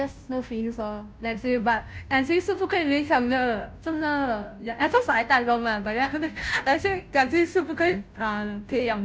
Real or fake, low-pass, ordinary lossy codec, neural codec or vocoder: fake; none; none; codec, 16 kHz, 0.5 kbps, FunCodec, trained on Chinese and English, 25 frames a second